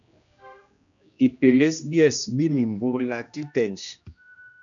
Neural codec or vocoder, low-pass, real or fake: codec, 16 kHz, 1 kbps, X-Codec, HuBERT features, trained on general audio; 7.2 kHz; fake